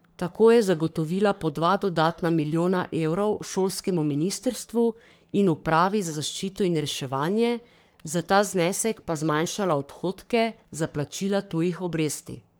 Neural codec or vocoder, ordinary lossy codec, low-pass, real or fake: codec, 44.1 kHz, 3.4 kbps, Pupu-Codec; none; none; fake